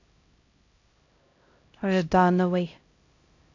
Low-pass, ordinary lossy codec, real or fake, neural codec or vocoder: 7.2 kHz; AAC, 48 kbps; fake; codec, 16 kHz, 0.5 kbps, X-Codec, HuBERT features, trained on LibriSpeech